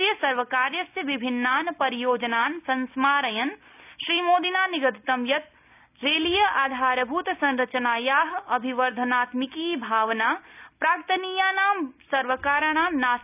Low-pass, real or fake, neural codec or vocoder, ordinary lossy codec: 3.6 kHz; real; none; none